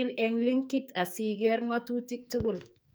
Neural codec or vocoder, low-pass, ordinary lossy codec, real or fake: codec, 44.1 kHz, 2.6 kbps, SNAC; none; none; fake